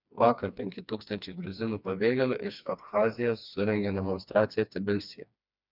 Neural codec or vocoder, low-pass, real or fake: codec, 16 kHz, 2 kbps, FreqCodec, smaller model; 5.4 kHz; fake